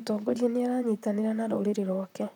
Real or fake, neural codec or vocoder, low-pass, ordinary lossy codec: fake; vocoder, 44.1 kHz, 128 mel bands, Pupu-Vocoder; 19.8 kHz; none